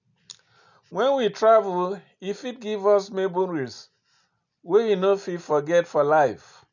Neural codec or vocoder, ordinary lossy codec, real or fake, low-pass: none; none; real; 7.2 kHz